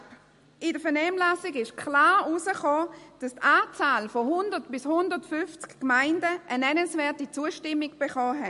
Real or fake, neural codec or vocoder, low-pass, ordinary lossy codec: real; none; 14.4 kHz; MP3, 48 kbps